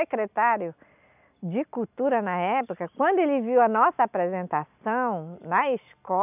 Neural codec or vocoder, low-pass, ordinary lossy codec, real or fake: none; 3.6 kHz; none; real